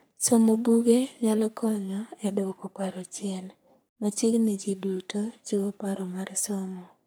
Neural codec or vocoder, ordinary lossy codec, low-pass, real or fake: codec, 44.1 kHz, 3.4 kbps, Pupu-Codec; none; none; fake